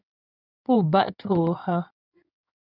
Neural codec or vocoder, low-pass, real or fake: codec, 16 kHz in and 24 kHz out, 2.2 kbps, FireRedTTS-2 codec; 5.4 kHz; fake